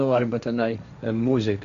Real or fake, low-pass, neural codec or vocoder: fake; 7.2 kHz; codec, 16 kHz, 1.1 kbps, Voila-Tokenizer